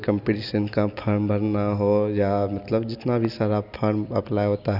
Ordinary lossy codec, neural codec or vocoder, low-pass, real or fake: none; none; 5.4 kHz; real